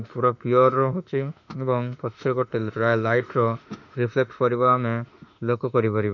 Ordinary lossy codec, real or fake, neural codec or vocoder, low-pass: none; fake; autoencoder, 48 kHz, 32 numbers a frame, DAC-VAE, trained on Japanese speech; 7.2 kHz